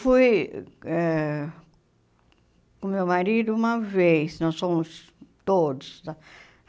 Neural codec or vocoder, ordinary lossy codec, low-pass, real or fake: none; none; none; real